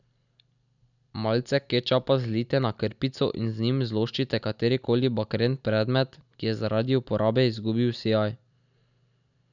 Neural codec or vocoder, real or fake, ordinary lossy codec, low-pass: none; real; none; 7.2 kHz